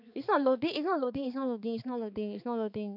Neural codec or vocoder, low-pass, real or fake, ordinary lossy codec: codec, 16 kHz, 4 kbps, X-Codec, HuBERT features, trained on balanced general audio; 5.4 kHz; fake; none